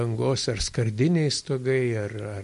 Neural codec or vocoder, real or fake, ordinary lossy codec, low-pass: none; real; MP3, 48 kbps; 14.4 kHz